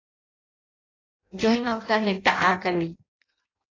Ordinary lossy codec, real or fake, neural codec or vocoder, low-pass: AAC, 32 kbps; fake; codec, 16 kHz in and 24 kHz out, 0.6 kbps, FireRedTTS-2 codec; 7.2 kHz